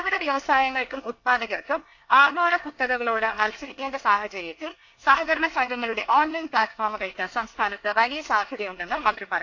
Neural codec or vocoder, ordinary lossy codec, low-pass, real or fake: codec, 24 kHz, 1 kbps, SNAC; AAC, 48 kbps; 7.2 kHz; fake